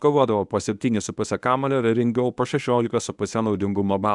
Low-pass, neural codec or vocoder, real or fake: 10.8 kHz; codec, 24 kHz, 0.9 kbps, WavTokenizer, medium speech release version 1; fake